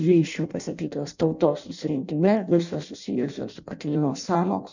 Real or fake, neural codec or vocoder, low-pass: fake; codec, 16 kHz in and 24 kHz out, 0.6 kbps, FireRedTTS-2 codec; 7.2 kHz